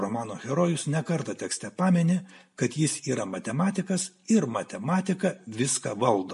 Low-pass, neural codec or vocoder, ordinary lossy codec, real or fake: 14.4 kHz; none; MP3, 48 kbps; real